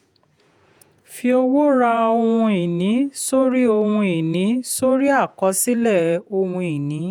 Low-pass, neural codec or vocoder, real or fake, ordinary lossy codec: 19.8 kHz; vocoder, 48 kHz, 128 mel bands, Vocos; fake; none